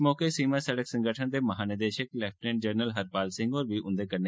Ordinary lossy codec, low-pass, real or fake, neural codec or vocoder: none; none; real; none